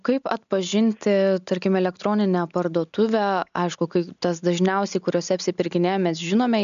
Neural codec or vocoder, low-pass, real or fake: none; 7.2 kHz; real